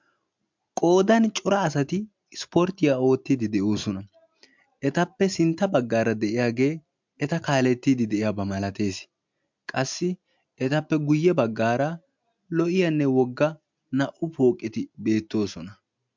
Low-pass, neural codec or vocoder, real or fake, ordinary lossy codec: 7.2 kHz; none; real; MP3, 64 kbps